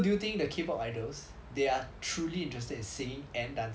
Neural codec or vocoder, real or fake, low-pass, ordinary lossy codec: none; real; none; none